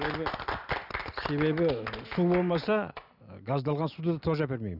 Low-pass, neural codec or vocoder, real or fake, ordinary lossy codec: 5.4 kHz; none; real; none